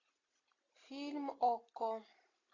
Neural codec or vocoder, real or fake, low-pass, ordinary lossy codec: none; real; 7.2 kHz; AAC, 32 kbps